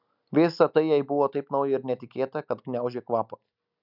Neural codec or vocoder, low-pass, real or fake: none; 5.4 kHz; real